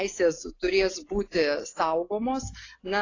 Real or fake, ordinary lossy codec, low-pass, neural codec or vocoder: real; AAC, 32 kbps; 7.2 kHz; none